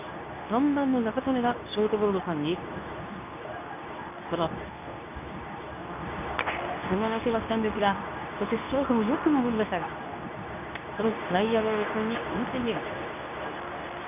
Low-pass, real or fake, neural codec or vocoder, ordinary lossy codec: 3.6 kHz; fake; codec, 24 kHz, 0.9 kbps, WavTokenizer, medium speech release version 2; none